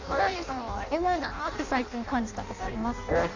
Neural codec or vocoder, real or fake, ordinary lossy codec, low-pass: codec, 16 kHz in and 24 kHz out, 0.6 kbps, FireRedTTS-2 codec; fake; Opus, 64 kbps; 7.2 kHz